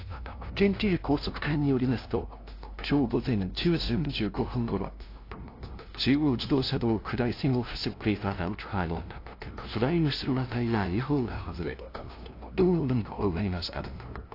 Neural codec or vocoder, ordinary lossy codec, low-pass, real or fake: codec, 16 kHz, 0.5 kbps, FunCodec, trained on LibriTTS, 25 frames a second; AAC, 32 kbps; 5.4 kHz; fake